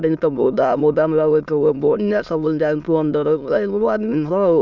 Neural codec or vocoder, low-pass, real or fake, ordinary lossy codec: autoencoder, 22.05 kHz, a latent of 192 numbers a frame, VITS, trained on many speakers; 7.2 kHz; fake; none